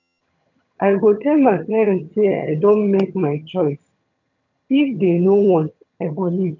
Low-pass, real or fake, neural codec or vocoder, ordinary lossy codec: 7.2 kHz; fake; vocoder, 22.05 kHz, 80 mel bands, HiFi-GAN; none